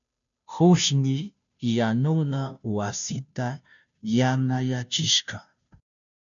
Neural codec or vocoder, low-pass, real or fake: codec, 16 kHz, 0.5 kbps, FunCodec, trained on Chinese and English, 25 frames a second; 7.2 kHz; fake